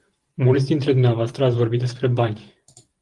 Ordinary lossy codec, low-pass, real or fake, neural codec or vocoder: Opus, 24 kbps; 10.8 kHz; fake; vocoder, 44.1 kHz, 128 mel bands every 512 samples, BigVGAN v2